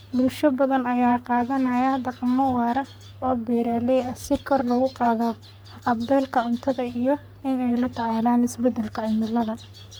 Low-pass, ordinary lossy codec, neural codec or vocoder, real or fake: none; none; codec, 44.1 kHz, 3.4 kbps, Pupu-Codec; fake